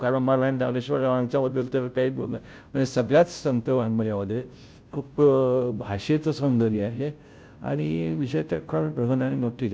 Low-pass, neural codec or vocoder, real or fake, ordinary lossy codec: none; codec, 16 kHz, 0.5 kbps, FunCodec, trained on Chinese and English, 25 frames a second; fake; none